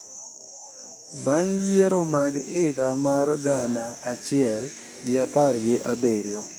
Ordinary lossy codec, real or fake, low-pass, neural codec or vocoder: none; fake; none; codec, 44.1 kHz, 2.6 kbps, DAC